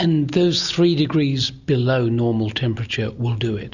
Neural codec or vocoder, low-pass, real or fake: none; 7.2 kHz; real